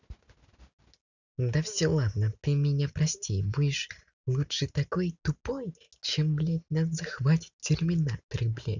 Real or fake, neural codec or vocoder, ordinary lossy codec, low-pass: real; none; none; 7.2 kHz